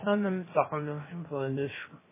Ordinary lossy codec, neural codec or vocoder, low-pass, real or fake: MP3, 16 kbps; codec, 16 kHz, 0.7 kbps, FocalCodec; 3.6 kHz; fake